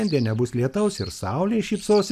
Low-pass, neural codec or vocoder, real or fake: 14.4 kHz; none; real